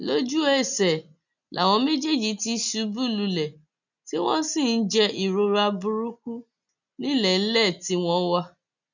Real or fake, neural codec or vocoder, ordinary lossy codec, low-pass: real; none; none; 7.2 kHz